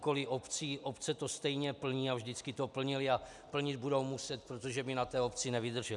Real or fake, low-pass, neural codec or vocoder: real; 10.8 kHz; none